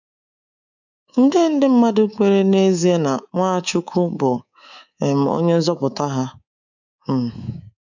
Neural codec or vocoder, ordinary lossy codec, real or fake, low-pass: codec, 24 kHz, 3.1 kbps, DualCodec; none; fake; 7.2 kHz